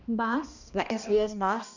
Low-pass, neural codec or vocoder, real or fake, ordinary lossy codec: 7.2 kHz; codec, 16 kHz, 1 kbps, X-Codec, HuBERT features, trained on balanced general audio; fake; none